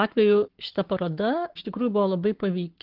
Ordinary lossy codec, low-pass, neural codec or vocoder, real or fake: Opus, 16 kbps; 5.4 kHz; codec, 44.1 kHz, 7.8 kbps, Pupu-Codec; fake